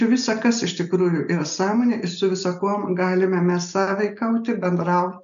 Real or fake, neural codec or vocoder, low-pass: real; none; 7.2 kHz